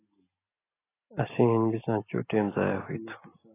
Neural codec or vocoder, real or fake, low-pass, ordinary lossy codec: none; real; 3.6 kHz; AAC, 16 kbps